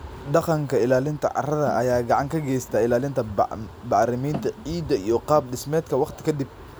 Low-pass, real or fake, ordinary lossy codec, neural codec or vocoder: none; real; none; none